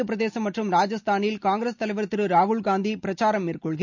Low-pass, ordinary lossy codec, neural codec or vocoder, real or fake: 7.2 kHz; none; none; real